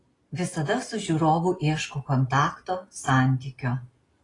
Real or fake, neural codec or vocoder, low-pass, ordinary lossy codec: real; none; 10.8 kHz; AAC, 32 kbps